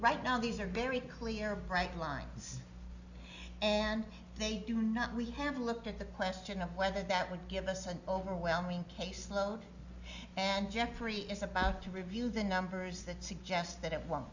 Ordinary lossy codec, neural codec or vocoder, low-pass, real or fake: Opus, 64 kbps; none; 7.2 kHz; real